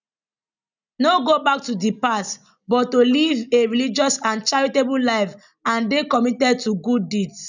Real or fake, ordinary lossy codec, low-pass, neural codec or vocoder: real; none; 7.2 kHz; none